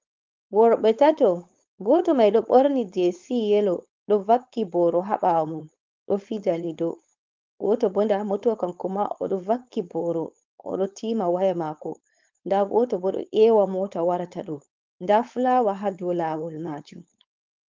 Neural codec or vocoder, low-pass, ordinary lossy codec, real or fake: codec, 16 kHz, 4.8 kbps, FACodec; 7.2 kHz; Opus, 24 kbps; fake